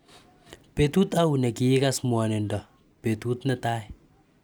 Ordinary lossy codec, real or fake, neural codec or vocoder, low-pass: none; real; none; none